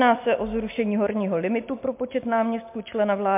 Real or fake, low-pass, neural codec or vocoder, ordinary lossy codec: real; 3.6 kHz; none; MP3, 32 kbps